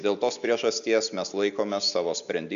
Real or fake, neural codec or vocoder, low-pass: real; none; 7.2 kHz